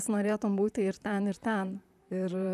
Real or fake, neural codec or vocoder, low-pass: fake; vocoder, 44.1 kHz, 128 mel bands, Pupu-Vocoder; 14.4 kHz